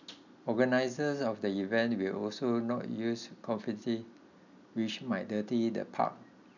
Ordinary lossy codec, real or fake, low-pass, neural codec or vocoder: none; real; 7.2 kHz; none